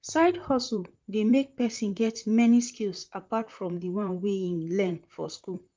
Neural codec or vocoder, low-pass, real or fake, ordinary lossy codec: vocoder, 44.1 kHz, 128 mel bands, Pupu-Vocoder; 7.2 kHz; fake; Opus, 32 kbps